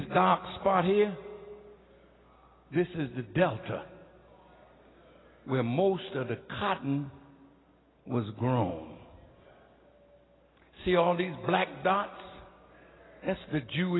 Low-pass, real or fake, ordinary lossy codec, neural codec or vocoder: 7.2 kHz; real; AAC, 16 kbps; none